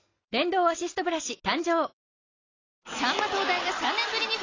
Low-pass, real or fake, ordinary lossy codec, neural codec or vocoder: 7.2 kHz; real; AAC, 32 kbps; none